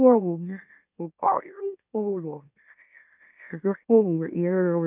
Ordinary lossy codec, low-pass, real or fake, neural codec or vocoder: none; 3.6 kHz; fake; autoencoder, 44.1 kHz, a latent of 192 numbers a frame, MeloTTS